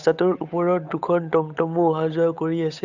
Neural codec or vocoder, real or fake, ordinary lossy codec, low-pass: codec, 16 kHz, 8 kbps, FunCodec, trained on Chinese and English, 25 frames a second; fake; none; 7.2 kHz